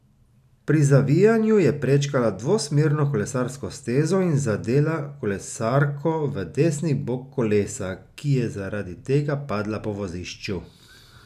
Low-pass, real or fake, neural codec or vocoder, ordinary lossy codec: 14.4 kHz; real; none; none